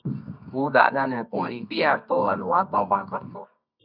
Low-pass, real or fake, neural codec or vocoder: 5.4 kHz; fake; codec, 24 kHz, 0.9 kbps, WavTokenizer, medium music audio release